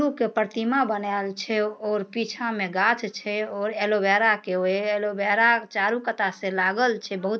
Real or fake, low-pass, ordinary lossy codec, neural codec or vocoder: real; none; none; none